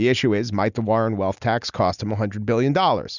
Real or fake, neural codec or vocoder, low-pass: real; none; 7.2 kHz